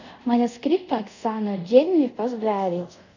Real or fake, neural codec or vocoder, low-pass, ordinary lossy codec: fake; codec, 24 kHz, 0.5 kbps, DualCodec; 7.2 kHz; none